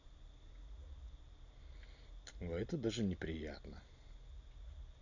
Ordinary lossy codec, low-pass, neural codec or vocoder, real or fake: none; 7.2 kHz; none; real